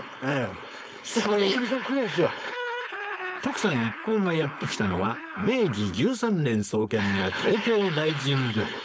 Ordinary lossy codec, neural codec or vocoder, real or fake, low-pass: none; codec, 16 kHz, 4.8 kbps, FACodec; fake; none